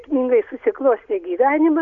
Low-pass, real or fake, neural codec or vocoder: 7.2 kHz; real; none